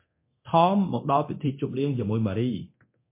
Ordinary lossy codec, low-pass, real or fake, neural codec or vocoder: MP3, 24 kbps; 3.6 kHz; fake; codec, 24 kHz, 0.9 kbps, DualCodec